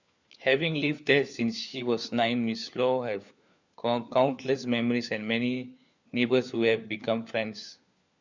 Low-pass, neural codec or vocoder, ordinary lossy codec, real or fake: 7.2 kHz; codec, 16 kHz, 4 kbps, FunCodec, trained on LibriTTS, 50 frames a second; Opus, 64 kbps; fake